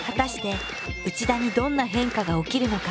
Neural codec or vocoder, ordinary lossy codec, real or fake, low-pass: none; none; real; none